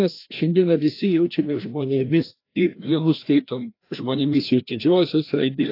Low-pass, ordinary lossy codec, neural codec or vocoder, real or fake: 5.4 kHz; AAC, 32 kbps; codec, 16 kHz, 1 kbps, FreqCodec, larger model; fake